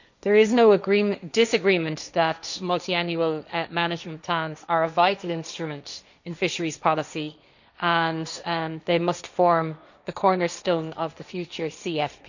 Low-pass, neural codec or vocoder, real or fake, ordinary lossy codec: 7.2 kHz; codec, 16 kHz, 1.1 kbps, Voila-Tokenizer; fake; none